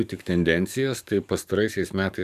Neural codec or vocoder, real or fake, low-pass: codec, 44.1 kHz, 7.8 kbps, DAC; fake; 14.4 kHz